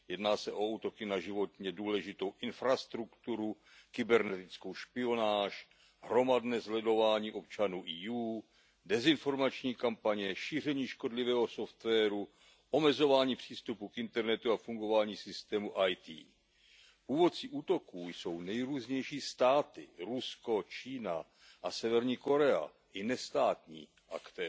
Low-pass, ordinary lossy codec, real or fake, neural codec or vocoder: none; none; real; none